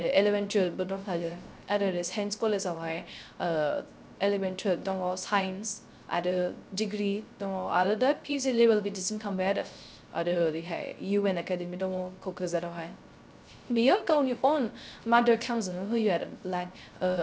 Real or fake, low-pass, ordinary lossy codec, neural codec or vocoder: fake; none; none; codec, 16 kHz, 0.3 kbps, FocalCodec